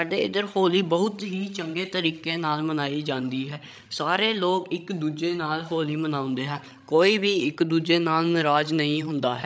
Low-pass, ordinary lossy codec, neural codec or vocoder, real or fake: none; none; codec, 16 kHz, 16 kbps, FreqCodec, larger model; fake